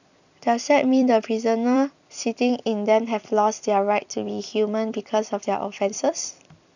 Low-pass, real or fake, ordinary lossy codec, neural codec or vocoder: 7.2 kHz; fake; none; vocoder, 44.1 kHz, 128 mel bands every 256 samples, BigVGAN v2